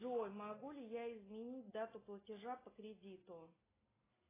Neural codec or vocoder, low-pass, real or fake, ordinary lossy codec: none; 3.6 kHz; real; AAC, 16 kbps